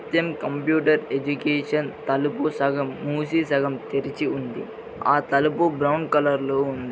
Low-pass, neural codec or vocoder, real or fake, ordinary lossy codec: none; none; real; none